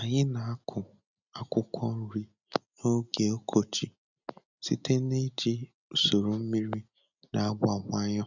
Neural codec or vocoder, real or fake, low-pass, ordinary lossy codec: none; real; 7.2 kHz; none